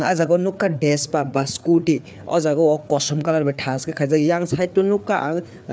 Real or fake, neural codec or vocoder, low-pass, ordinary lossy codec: fake; codec, 16 kHz, 4 kbps, FreqCodec, larger model; none; none